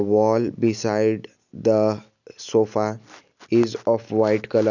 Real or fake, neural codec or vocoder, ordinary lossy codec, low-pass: real; none; none; 7.2 kHz